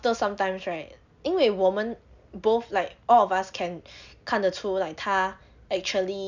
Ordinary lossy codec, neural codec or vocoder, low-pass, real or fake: none; none; 7.2 kHz; real